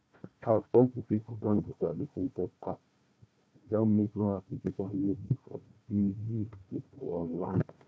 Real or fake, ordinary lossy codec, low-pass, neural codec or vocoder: fake; none; none; codec, 16 kHz, 1 kbps, FunCodec, trained on Chinese and English, 50 frames a second